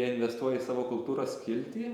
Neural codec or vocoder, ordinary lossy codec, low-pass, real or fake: vocoder, 48 kHz, 128 mel bands, Vocos; Opus, 64 kbps; 19.8 kHz; fake